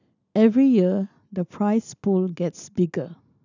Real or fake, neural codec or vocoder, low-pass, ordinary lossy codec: real; none; 7.2 kHz; none